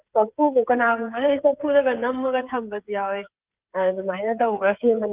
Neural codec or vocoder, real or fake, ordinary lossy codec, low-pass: codec, 16 kHz, 8 kbps, FreqCodec, smaller model; fake; Opus, 32 kbps; 3.6 kHz